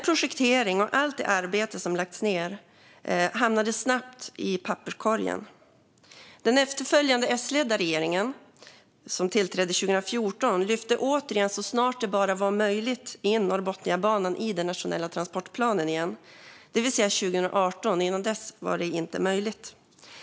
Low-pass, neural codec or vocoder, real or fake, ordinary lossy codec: none; none; real; none